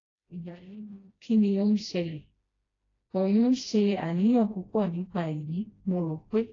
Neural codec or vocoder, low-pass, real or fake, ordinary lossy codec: codec, 16 kHz, 1 kbps, FreqCodec, smaller model; 7.2 kHz; fake; AAC, 32 kbps